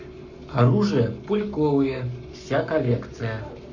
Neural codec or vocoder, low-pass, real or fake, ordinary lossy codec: codec, 44.1 kHz, 7.8 kbps, Pupu-Codec; 7.2 kHz; fake; none